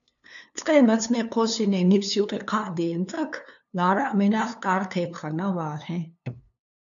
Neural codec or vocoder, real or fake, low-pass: codec, 16 kHz, 2 kbps, FunCodec, trained on LibriTTS, 25 frames a second; fake; 7.2 kHz